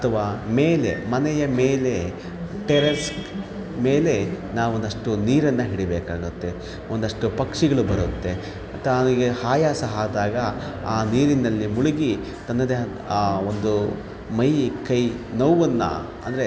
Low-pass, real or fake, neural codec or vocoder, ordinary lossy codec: none; real; none; none